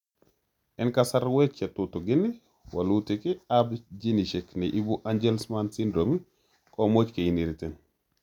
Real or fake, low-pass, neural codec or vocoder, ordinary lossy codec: real; 19.8 kHz; none; none